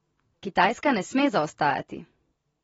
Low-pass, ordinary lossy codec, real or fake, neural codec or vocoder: 19.8 kHz; AAC, 24 kbps; real; none